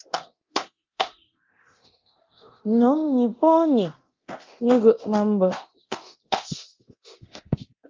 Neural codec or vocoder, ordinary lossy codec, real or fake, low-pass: codec, 24 kHz, 0.9 kbps, DualCodec; Opus, 32 kbps; fake; 7.2 kHz